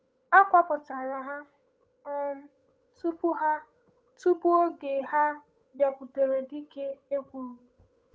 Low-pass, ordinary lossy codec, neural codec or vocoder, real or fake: none; none; codec, 16 kHz, 8 kbps, FunCodec, trained on Chinese and English, 25 frames a second; fake